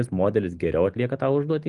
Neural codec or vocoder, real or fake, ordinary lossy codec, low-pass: none; real; Opus, 32 kbps; 10.8 kHz